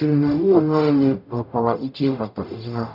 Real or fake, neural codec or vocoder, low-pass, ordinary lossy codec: fake; codec, 44.1 kHz, 0.9 kbps, DAC; 5.4 kHz; none